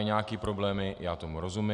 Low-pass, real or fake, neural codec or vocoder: 10.8 kHz; real; none